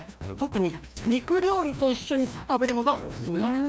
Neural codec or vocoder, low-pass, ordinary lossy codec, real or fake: codec, 16 kHz, 1 kbps, FreqCodec, larger model; none; none; fake